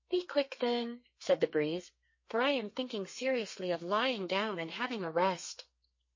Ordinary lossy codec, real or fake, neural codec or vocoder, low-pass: MP3, 32 kbps; fake; codec, 32 kHz, 1.9 kbps, SNAC; 7.2 kHz